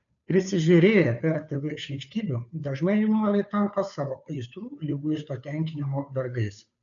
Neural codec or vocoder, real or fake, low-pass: codec, 16 kHz, 2 kbps, FunCodec, trained on Chinese and English, 25 frames a second; fake; 7.2 kHz